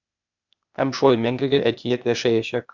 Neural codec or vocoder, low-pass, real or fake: codec, 16 kHz, 0.8 kbps, ZipCodec; 7.2 kHz; fake